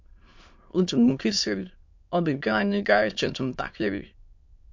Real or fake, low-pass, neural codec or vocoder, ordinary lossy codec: fake; 7.2 kHz; autoencoder, 22.05 kHz, a latent of 192 numbers a frame, VITS, trained on many speakers; MP3, 48 kbps